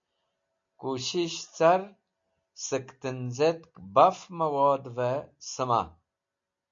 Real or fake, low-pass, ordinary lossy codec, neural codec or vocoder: real; 7.2 kHz; MP3, 48 kbps; none